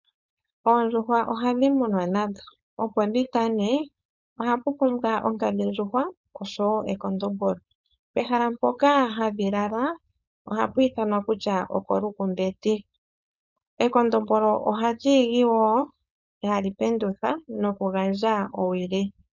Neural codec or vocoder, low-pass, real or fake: codec, 16 kHz, 4.8 kbps, FACodec; 7.2 kHz; fake